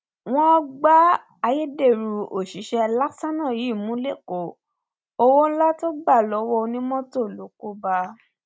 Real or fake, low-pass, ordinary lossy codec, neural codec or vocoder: real; none; none; none